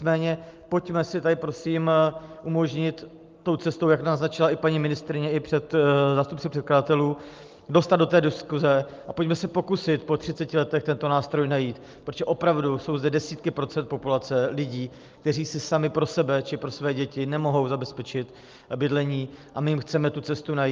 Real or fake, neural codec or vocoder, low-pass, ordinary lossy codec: real; none; 7.2 kHz; Opus, 32 kbps